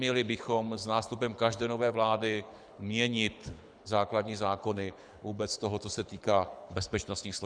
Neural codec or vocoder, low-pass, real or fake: codec, 24 kHz, 6 kbps, HILCodec; 9.9 kHz; fake